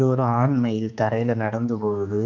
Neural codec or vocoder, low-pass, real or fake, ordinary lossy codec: codec, 16 kHz, 2 kbps, X-Codec, HuBERT features, trained on general audio; 7.2 kHz; fake; none